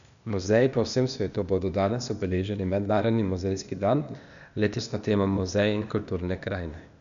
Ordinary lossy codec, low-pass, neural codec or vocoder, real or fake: none; 7.2 kHz; codec, 16 kHz, 0.8 kbps, ZipCodec; fake